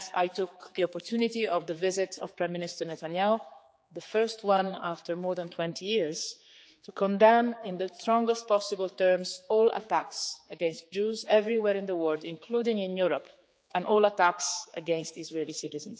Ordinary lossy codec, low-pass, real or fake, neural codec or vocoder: none; none; fake; codec, 16 kHz, 4 kbps, X-Codec, HuBERT features, trained on general audio